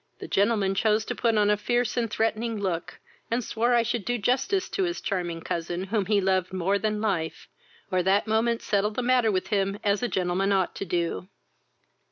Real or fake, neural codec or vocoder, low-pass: real; none; 7.2 kHz